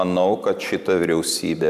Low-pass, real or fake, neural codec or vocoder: 14.4 kHz; fake; vocoder, 44.1 kHz, 128 mel bands every 512 samples, BigVGAN v2